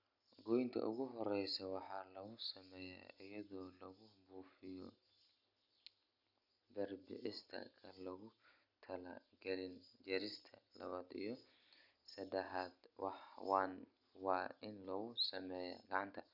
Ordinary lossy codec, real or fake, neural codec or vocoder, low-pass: none; real; none; 5.4 kHz